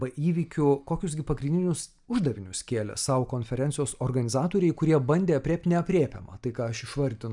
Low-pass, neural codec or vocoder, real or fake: 10.8 kHz; none; real